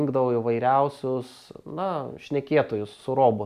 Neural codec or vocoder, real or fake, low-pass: none; real; 14.4 kHz